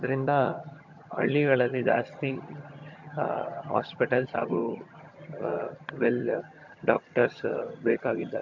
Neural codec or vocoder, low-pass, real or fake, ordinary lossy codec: vocoder, 22.05 kHz, 80 mel bands, HiFi-GAN; 7.2 kHz; fake; MP3, 48 kbps